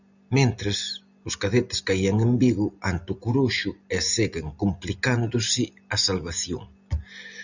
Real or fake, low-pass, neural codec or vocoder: real; 7.2 kHz; none